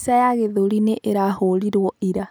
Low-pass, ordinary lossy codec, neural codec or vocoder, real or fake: none; none; none; real